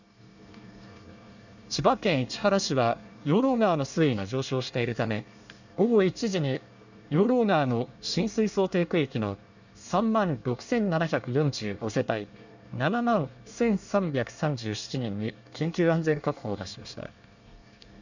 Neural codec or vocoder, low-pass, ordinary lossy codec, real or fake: codec, 24 kHz, 1 kbps, SNAC; 7.2 kHz; none; fake